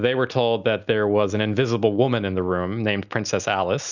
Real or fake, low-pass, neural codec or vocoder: real; 7.2 kHz; none